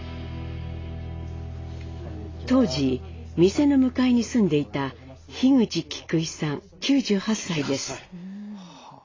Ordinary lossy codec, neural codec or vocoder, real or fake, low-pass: AAC, 32 kbps; none; real; 7.2 kHz